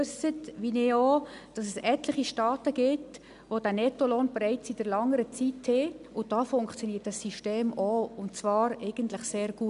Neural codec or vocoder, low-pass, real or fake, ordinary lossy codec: none; 10.8 kHz; real; none